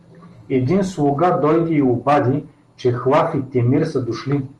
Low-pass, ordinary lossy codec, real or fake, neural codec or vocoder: 10.8 kHz; Opus, 24 kbps; real; none